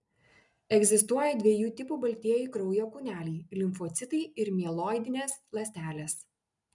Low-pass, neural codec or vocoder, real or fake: 10.8 kHz; none; real